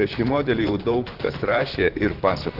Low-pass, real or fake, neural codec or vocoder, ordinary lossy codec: 5.4 kHz; fake; vocoder, 44.1 kHz, 128 mel bands, Pupu-Vocoder; Opus, 16 kbps